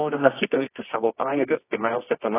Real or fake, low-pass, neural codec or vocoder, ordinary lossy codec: fake; 3.6 kHz; codec, 24 kHz, 0.9 kbps, WavTokenizer, medium music audio release; AAC, 16 kbps